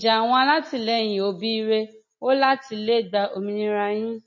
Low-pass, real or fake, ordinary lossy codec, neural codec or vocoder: 7.2 kHz; real; MP3, 32 kbps; none